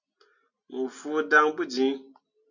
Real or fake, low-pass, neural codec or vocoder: real; 7.2 kHz; none